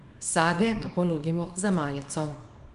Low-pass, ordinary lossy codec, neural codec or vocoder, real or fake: 10.8 kHz; none; codec, 24 kHz, 0.9 kbps, WavTokenizer, small release; fake